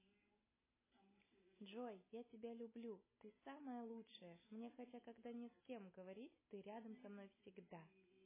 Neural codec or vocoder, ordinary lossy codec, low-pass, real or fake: none; MP3, 16 kbps; 3.6 kHz; real